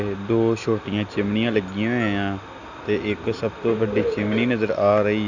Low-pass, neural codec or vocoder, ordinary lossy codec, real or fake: 7.2 kHz; none; none; real